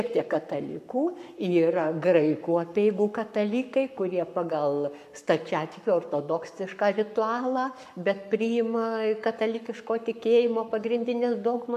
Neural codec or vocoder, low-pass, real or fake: codec, 44.1 kHz, 7.8 kbps, Pupu-Codec; 14.4 kHz; fake